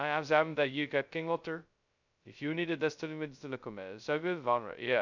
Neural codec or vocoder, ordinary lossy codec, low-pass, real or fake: codec, 16 kHz, 0.2 kbps, FocalCodec; none; 7.2 kHz; fake